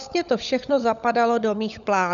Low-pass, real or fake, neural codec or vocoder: 7.2 kHz; fake; codec, 16 kHz, 16 kbps, FunCodec, trained on LibriTTS, 50 frames a second